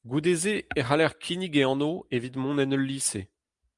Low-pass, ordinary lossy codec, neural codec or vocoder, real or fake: 10.8 kHz; Opus, 32 kbps; none; real